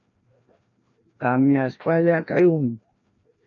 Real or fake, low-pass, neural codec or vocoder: fake; 7.2 kHz; codec, 16 kHz, 1 kbps, FreqCodec, larger model